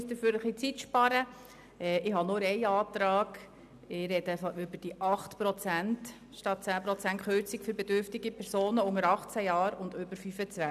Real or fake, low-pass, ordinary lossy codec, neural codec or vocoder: real; 14.4 kHz; none; none